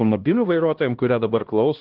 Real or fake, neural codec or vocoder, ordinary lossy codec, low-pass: fake; codec, 16 kHz, 1 kbps, X-Codec, HuBERT features, trained on LibriSpeech; Opus, 16 kbps; 5.4 kHz